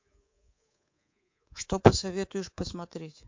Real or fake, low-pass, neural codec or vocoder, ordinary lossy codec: fake; 7.2 kHz; codec, 24 kHz, 3.1 kbps, DualCodec; MP3, 48 kbps